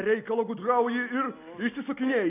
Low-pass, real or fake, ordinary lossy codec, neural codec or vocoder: 3.6 kHz; real; AAC, 24 kbps; none